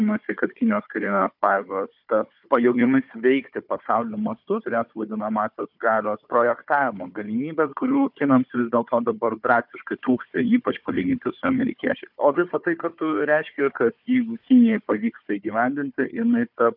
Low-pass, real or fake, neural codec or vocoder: 5.4 kHz; fake; codec, 16 kHz, 4 kbps, FunCodec, trained on Chinese and English, 50 frames a second